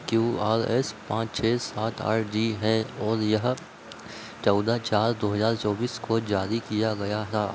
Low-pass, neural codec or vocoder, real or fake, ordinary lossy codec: none; none; real; none